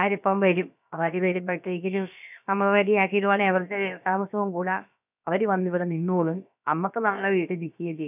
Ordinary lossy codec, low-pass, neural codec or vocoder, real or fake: none; 3.6 kHz; codec, 16 kHz, about 1 kbps, DyCAST, with the encoder's durations; fake